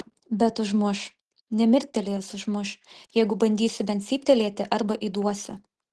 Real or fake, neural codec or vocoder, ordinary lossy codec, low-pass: real; none; Opus, 16 kbps; 10.8 kHz